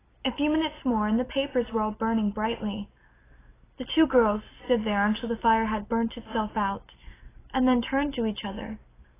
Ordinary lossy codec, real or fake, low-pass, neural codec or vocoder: AAC, 16 kbps; real; 3.6 kHz; none